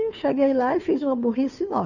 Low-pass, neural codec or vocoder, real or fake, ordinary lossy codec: 7.2 kHz; codec, 16 kHz, 2 kbps, FunCodec, trained on Chinese and English, 25 frames a second; fake; none